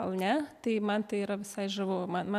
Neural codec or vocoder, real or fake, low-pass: none; real; 14.4 kHz